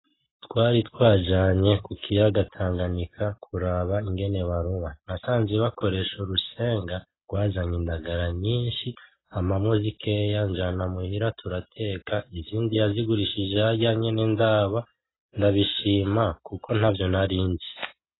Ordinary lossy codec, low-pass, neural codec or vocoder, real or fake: AAC, 16 kbps; 7.2 kHz; none; real